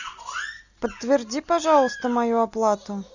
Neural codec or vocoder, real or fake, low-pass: none; real; 7.2 kHz